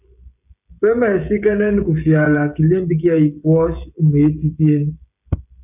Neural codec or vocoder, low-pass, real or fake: codec, 16 kHz, 16 kbps, FreqCodec, smaller model; 3.6 kHz; fake